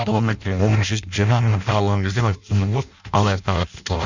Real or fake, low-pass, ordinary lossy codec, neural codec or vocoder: fake; 7.2 kHz; none; codec, 16 kHz in and 24 kHz out, 0.6 kbps, FireRedTTS-2 codec